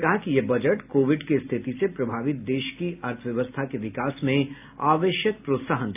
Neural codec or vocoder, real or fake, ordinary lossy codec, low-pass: none; real; AAC, 32 kbps; 3.6 kHz